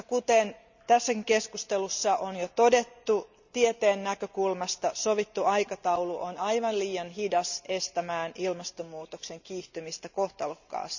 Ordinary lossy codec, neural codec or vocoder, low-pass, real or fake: none; none; 7.2 kHz; real